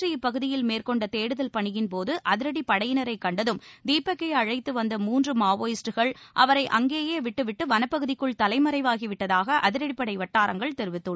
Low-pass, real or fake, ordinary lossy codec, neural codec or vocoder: none; real; none; none